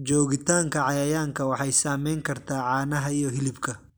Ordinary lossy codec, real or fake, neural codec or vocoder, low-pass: none; real; none; none